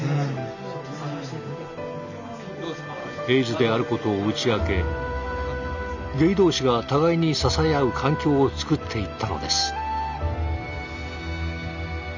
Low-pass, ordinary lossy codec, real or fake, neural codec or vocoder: 7.2 kHz; none; real; none